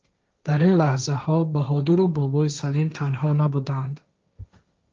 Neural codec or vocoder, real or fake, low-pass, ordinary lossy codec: codec, 16 kHz, 1.1 kbps, Voila-Tokenizer; fake; 7.2 kHz; Opus, 24 kbps